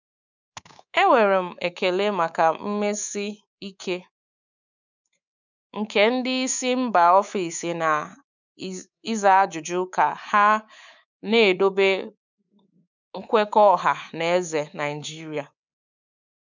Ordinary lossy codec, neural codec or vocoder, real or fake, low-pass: none; codec, 24 kHz, 3.1 kbps, DualCodec; fake; 7.2 kHz